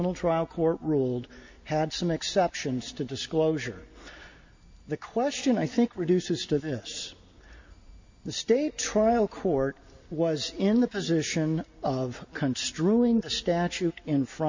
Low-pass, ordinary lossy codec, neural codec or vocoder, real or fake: 7.2 kHz; MP3, 64 kbps; none; real